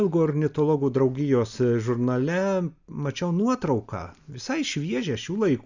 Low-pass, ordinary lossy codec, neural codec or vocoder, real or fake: 7.2 kHz; Opus, 64 kbps; none; real